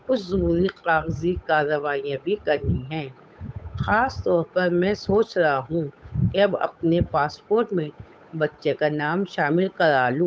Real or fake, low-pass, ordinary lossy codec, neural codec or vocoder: fake; none; none; codec, 16 kHz, 8 kbps, FunCodec, trained on Chinese and English, 25 frames a second